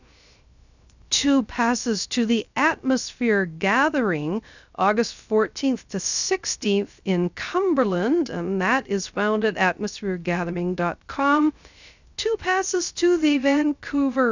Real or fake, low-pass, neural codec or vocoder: fake; 7.2 kHz; codec, 16 kHz, 0.3 kbps, FocalCodec